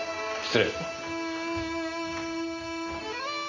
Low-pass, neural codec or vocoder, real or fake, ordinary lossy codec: 7.2 kHz; none; real; none